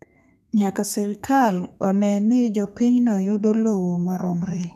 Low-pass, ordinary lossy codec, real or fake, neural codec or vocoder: 14.4 kHz; none; fake; codec, 32 kHz, 1.9 kbps, SNAC